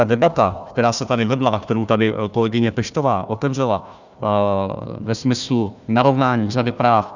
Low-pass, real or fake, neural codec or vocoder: 7.2 kHz; fake; codec, 16 kHz, 1 kbps, FunCodec, trained on Chinese and English, 50 frames a second